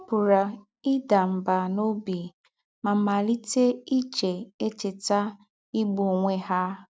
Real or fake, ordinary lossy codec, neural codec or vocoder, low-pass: real; none; none; none